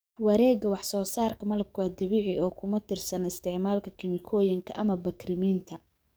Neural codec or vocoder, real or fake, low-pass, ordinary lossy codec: codec, 44.1 kHz, 7.8 kbps, DAC; fake; none; none